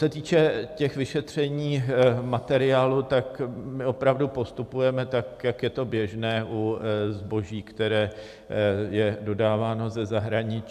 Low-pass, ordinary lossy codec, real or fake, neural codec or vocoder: 14.4 kHz; AAC, 96 kbps; real; none